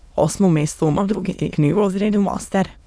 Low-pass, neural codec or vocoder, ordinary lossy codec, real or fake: none; autoencoder, 22.05 kHz, a latent of 192 numbers a frame, VITS, trained on many speakers; none; fake